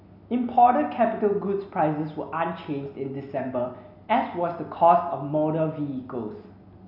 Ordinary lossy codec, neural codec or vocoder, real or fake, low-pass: none; none; real; 5.4 kHz